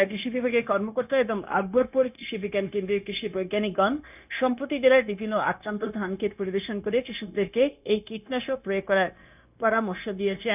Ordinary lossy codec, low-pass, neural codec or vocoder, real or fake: none; 3.6 kHz; codec, 16 kHz, 0.9 kbps, LongCat-Audio-Codec; fake